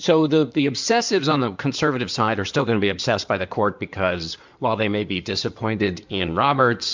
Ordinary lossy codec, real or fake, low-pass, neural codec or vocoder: MP3, 64 kbps; fake; 7.2 kHz; codec, 16 kHz in and 24 kHz out, 2.2 kbps, FireRedTTS-2 codec